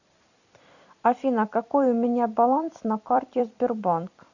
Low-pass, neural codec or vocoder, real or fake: 7.2 kHz; vocoder, 44.1 kHz, 128 mel bands every 256 samples, BigVGAN v2; fake